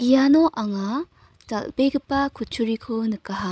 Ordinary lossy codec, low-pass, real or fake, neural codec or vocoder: none; none; fake; codec, 16 kHz, 16 kbps, FreqCodec, larger model